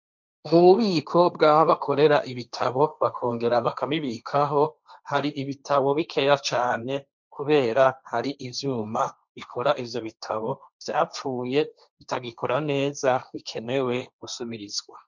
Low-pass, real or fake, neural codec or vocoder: 7.2 kHz; fake; codec, 16 kHz, 1.1 kbps, Voila-Tokenizer